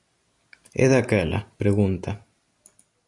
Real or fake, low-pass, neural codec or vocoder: real; 10.8 kHz; none